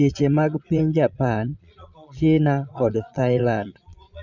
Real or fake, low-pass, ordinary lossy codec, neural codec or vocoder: fake; 7.2 kHz; none; vocoder, 22.05 kHz, 80 mel bands, Vocos